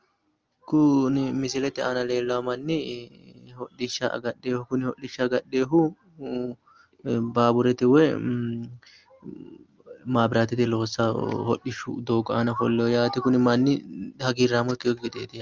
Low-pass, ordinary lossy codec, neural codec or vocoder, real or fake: 7.2 kHz; Opus, 24 kbps; none; real